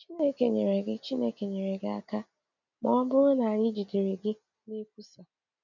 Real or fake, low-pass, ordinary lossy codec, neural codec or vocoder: real; 7.2 kHz; none; none